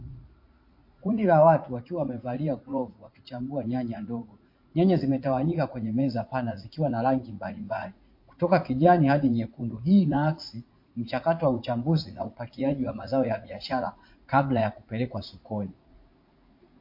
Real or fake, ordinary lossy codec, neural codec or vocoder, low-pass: fake; MP3, 32 kbps; vocoder, 44.1 kHz, 80 mel bands, Vocos; 5.4 kHz